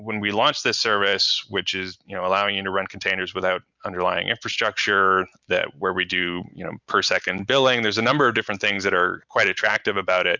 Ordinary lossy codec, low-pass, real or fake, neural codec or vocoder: Opus, 64 kbps; 7.2 kHz; real; none